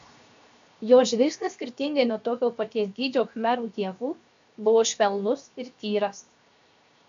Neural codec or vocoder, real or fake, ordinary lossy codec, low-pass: codec, 16 kHz, 0.7 kbps, FocalCodec; fake; AAC, 64 kbps; 7.2 kHz